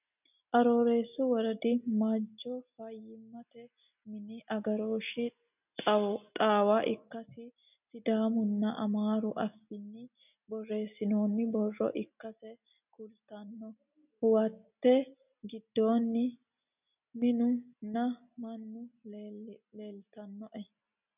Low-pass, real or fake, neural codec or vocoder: 3.6 kHz; real; none